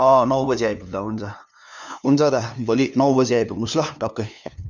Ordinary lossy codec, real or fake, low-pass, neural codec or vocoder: none; fake; none; codec, 16 kHz, 2 kbps, FunCodec, trained on LibriTTS, 25 frames a second